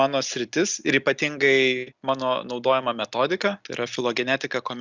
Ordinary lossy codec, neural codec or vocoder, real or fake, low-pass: Opus, 64 kbps; none; real; 7.2 kHz